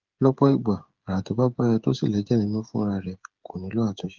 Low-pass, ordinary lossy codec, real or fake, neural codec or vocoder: 7.2 kHz; Opus, 32 kbps; fake; codec, 16 kHz, 8 kbps, FreqCodec, smaller model